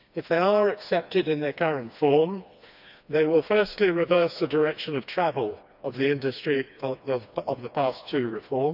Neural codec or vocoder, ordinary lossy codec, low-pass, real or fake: codec, 16 kHz, 2 kbps, FreqCodec, smaller model; none; 5.4 kHz; fake